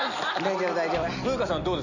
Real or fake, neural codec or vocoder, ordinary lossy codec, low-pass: real; none; none; 7.2 kHz